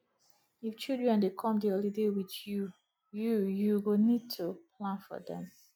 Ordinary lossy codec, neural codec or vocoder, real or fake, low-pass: none; none; real; 19.8 kHz